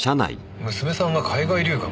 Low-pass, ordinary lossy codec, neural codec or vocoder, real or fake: none; none; none; real